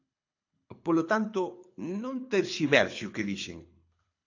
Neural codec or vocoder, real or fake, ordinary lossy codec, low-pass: codec, 24 kHz, 6 kbps, HILCodec; fake; AAC, 48 kbps; 7.2 kHz